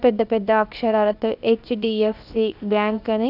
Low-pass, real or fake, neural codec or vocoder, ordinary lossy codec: 5.4 kHz; fake; codec, 16 kHz, 0.7 kbps, FocalCodec; none